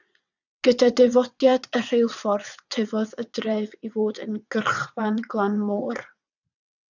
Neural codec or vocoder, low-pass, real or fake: vocoder, 22.05 kHz, 80 mel bands, WaveNeXt; 7.2 kHz; fake